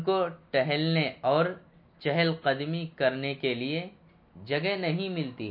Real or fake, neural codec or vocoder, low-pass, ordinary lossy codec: real; none; 5.4 kHz; MP3, 32 kbps